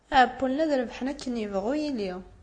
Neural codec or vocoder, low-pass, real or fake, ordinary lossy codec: none; 9.9 kHz; real; AAC, 48 kbps